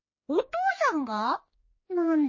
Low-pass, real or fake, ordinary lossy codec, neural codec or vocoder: 7.2 kHz; fake; MP3, 32 kbps; codec, 16 kHz, 2 kbps, X-Codec, HuBERT features, trained on general audio